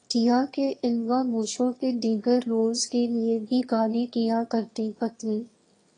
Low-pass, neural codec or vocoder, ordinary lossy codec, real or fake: 9.9 kHz; autoencoder, 22.05 kHz, a latent of 192 numbers a frame, VITS, trained on one speaker; AAC, 32 kbps; fake